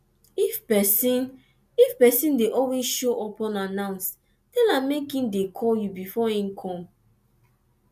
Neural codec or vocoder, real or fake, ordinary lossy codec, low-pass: none; real; none; 14.4 kHz